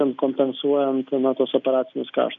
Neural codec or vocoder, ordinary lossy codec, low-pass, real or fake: none; AAC, 64 kbps; 7.2 kHz; real